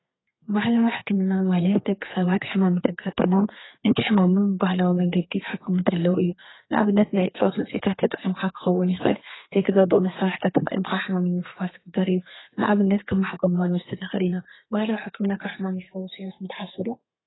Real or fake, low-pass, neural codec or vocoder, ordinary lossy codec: fake; 7.2 kHz; codec, 32 kHz, 1.9 kbps, SNAC; AAC, 16 kbps